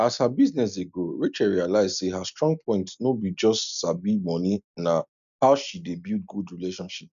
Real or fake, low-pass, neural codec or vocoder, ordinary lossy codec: real; 7.2 kHz; none; none